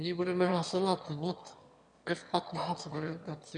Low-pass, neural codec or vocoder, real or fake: 9.9 kHz; autoencoder, 22.05 kHz, a latent of 192 numbers a frame, VITS, trained on one speaker; fake